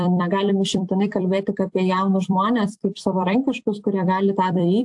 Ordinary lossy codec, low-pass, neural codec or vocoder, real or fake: AAC, 64 kbps; 10.8 kHz; vocoder, 44.1 kHz, 128 mel bands every 256 samples, BigVGAN v2; fake